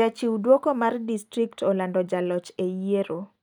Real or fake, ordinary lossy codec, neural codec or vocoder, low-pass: real; none; none; 19.8 kHz